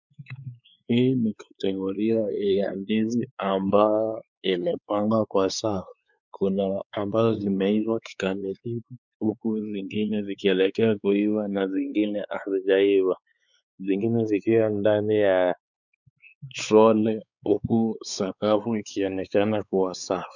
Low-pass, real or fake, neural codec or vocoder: 7.2 kHz; fake; codec, 16 kHz, 4 kbps, X-Codec, WavLM features, trained on Multilingual LibriSpeech